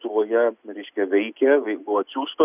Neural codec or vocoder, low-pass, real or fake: none; 3.6 kHz; real